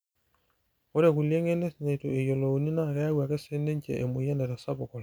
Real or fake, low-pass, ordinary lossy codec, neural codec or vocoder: real; none; none; none